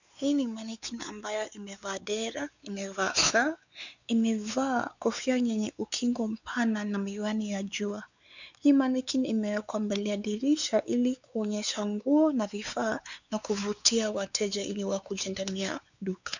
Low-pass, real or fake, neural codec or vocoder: 7.2 kHz; fake; codec, 16 kHz, 4 kbps, X-Codec, WavLM features, trained on Multilingual LibriSpeech